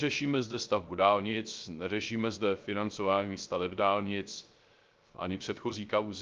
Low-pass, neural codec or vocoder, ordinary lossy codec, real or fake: 7.2 kHz; codec, 16 kHz, 0.3 kbps, FocalCodec; Opus, 32 kbps; fake